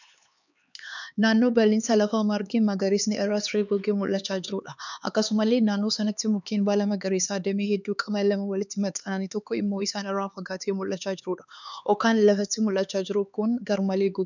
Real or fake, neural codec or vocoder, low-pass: fake; codec, 16 kHz, 4 kbps, X-Codec, HuBERT features, trained on LibriSpeech; 7.2 kHz